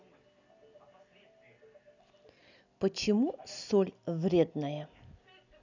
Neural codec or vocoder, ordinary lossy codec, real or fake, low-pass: none; none; real; 7.2 kHz